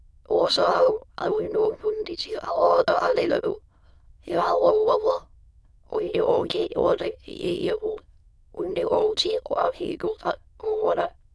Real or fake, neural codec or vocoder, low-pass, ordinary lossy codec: fake; autoencoder, 22.05 kHz, a latent of 192 numbers a frame, VITS, trained on many speakers; none; none